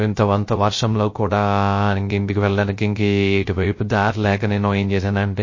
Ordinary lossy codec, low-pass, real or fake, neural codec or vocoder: MP3, 32 kbps; 7.2 kHz; fake; codec, 16 kHz, 0.3 kbps, FocalCodec